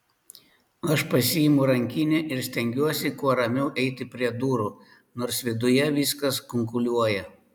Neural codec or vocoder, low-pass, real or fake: none; 19.8 kHz; real